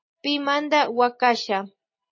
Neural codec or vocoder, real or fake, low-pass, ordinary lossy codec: none; real; 7.2 kHz; MP3, 32 kbps